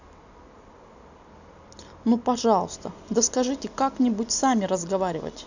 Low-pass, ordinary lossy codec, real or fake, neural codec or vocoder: 7.2 kHz; none; real; none